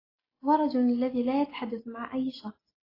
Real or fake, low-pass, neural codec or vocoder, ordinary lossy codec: real; 5.4 kHz; none; AAC, 24 kbps